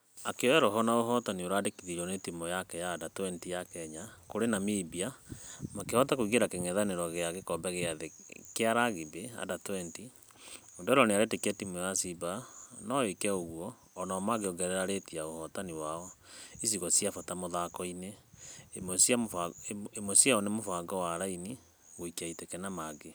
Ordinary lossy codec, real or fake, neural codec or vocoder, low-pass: none; real; none; none